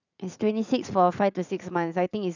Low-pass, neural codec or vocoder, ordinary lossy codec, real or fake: 7.2 kHz; vocoder, 44.1 kHz, 80 mel bands, Vocos; none; fake